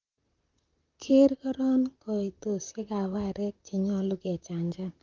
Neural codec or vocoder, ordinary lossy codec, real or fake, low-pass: vocoder, 44.1 kHz, 128 mel bands, Pupu-Vocoder; Opus, 24 kbps; fake; 7.2 kHz